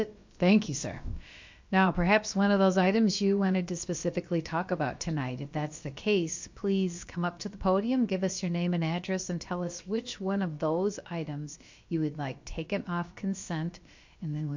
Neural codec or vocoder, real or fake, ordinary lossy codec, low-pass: codec, 16 kHz, about 1 kbps, DyCAST, with the encoder's durations; fake; MP3, 48 kbps; 7.2 kHz